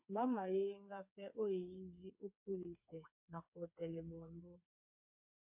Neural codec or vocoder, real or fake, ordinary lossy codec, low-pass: codec, 16 kHz, 4 kbps, FreqCodec, smaller model; fake; MP3, 32 kbps; 3.6 kHz